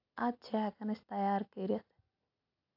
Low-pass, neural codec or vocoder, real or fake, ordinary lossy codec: 5.4 kHz; none; real; AAC, 48 kbps